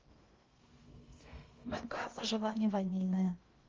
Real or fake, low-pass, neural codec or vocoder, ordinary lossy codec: fake; 7.2 kHz; codec, 16 kHz in and 24 kHz out, 0.6 kbps, FocalCodec, streaming, 2048 codes; Opus, 32 kbps